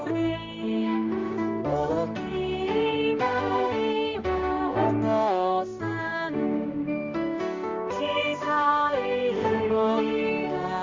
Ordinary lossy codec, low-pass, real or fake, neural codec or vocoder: Opus, 32 kbps; 7.2 kHz; fake; codec, 16 kHz, 1 kbps, X-Codec, HuBERT features, trained on balanced general audio